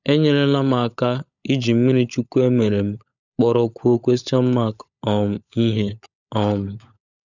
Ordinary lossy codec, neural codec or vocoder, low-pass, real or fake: none; codec, 16 kHz, 16 kbps, FunCodec, trained on LibriTTS, 50 frames a second; 7.2 kHz; fake